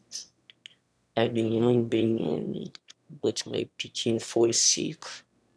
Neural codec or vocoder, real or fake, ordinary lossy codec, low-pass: autoencoder, 22.05 kHz, a latent of 192 numbers a frame, VITS, trained on one speaker; fake; none; none